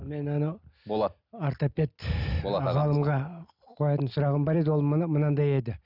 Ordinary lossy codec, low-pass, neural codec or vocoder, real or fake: none; 5.4 kHz; none; real